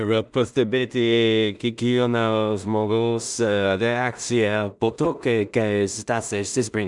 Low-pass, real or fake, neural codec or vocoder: 10.8 kHz; fake; codec, 16 kHz in and 24 kHz out, 0.4 kbps, LongCat-Audio-Codec, two codebook decoder